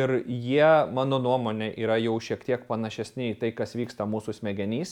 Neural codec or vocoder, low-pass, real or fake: none; 19.8 kHz; real